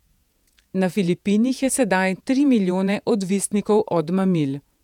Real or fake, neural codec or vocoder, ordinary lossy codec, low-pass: fake; vocoder, 48 kHz, 128 mel bands, Vocos; none; 19.8 kHz